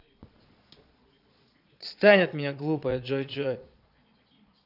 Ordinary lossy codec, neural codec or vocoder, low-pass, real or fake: none; vocoder, 22.05 kHz, 80 mel bands, Vocos; 5.4 kHz; fake